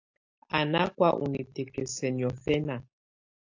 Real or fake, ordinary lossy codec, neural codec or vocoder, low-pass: real; AAC, 48 kbps; none; 7.2 kHz